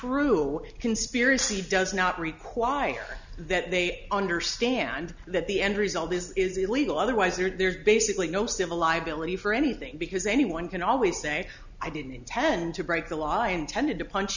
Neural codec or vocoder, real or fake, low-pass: none; real; 7.2 kHz